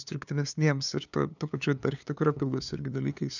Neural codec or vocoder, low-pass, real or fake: codec, 16 kHz, 2 kbps, FunCodec, trained on LibriTTS, 25 frames a second; 7.2 kHz; fake